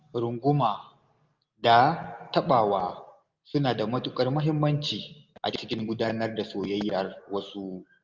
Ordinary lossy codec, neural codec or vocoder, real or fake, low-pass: Opus, 24 kbps; none; real; 7.2 kHz